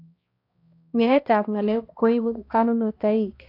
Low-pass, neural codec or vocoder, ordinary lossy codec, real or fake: 5.4 kHz; codec, 16 kHz, 1 kbps, X-Codec, HuBERT features, trained on balanced general audio; AAC, 32 kbps; fake